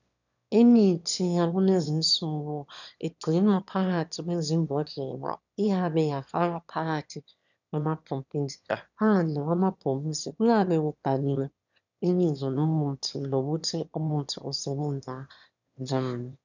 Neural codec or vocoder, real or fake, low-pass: autoencoder, 22.05 kHz, a latent of 192 numbers a frame, VITS, trained on one speaker; fake; 7.2 kHz